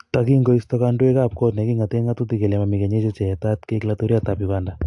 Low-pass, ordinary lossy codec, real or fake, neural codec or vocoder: 10.8 kHz; AAC, 64 kbps; real; none